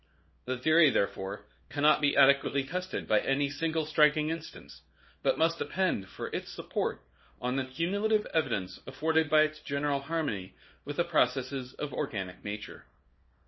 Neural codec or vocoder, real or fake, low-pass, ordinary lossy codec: codec, 24 kHz, 0.9 kbps, WavTokenizer, small release; fake; 7.2 kHz; MP3, 24 kbps